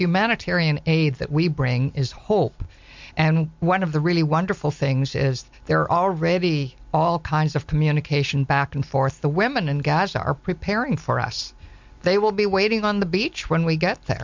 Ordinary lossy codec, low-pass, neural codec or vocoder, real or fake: MP3, 64 kbps; 7.2 kHz; none; real